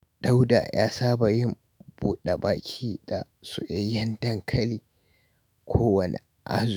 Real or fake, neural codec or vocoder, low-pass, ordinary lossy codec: fake; autoencoder, 48 kHz, 128 numbers a frame, DAC-VAE, trained on Japanese speech; none; none